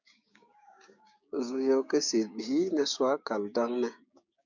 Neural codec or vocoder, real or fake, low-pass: codec, 16 kHz, 6 kbps, DAC; fake; 7.2 kHz